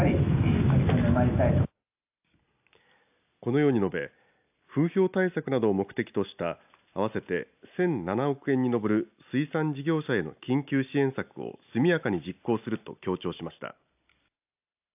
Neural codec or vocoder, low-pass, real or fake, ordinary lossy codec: none; 3.6 kHz; real; AAC, 32 kbps